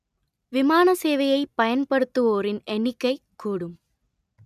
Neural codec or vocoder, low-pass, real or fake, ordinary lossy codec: none; 14.4 kHz; real; none